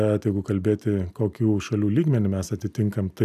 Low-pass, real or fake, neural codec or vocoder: 14.4 kHz; real; none